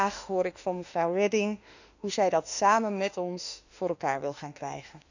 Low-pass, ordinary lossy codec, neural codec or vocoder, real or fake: 7.2 kHz; none; autoencoder, 48 kHz, 32 numbers a frame, DAC-VAE, trained on Japanese speech; fake